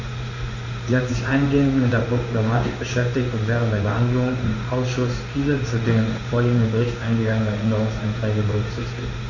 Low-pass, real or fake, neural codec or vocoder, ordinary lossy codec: 7.2 kHz; fake; codec, 16 kHz in and 24 kHz out, 1 kbps, XY-Tokenizer; MP3, 48 kbps